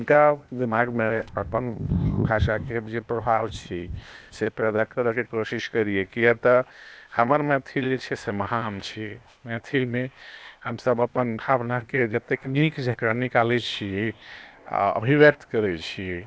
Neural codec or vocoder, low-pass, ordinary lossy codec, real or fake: codec, 16 kHz, 0.8 kbps, ZipCodec; none; none; fake